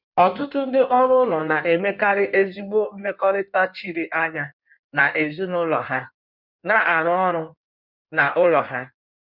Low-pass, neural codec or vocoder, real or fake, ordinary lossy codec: 5.4 kHz; codec, 16 kHz in and 24 kHz out, 1.1 kbps, FireRedTTS-2 codec; fake; none